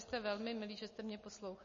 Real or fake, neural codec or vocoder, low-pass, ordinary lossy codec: real; none; 7.2 kHz; MP3, 32 kbps